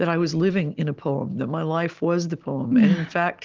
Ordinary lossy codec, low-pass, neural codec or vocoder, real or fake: Opus, 32 kbps; 7.2 kHz; none; real